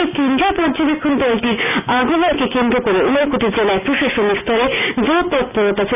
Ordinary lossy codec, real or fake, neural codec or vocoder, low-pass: none; real; none; 3.6 kHz